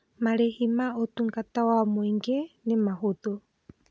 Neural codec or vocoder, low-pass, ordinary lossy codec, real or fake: none; none; none; real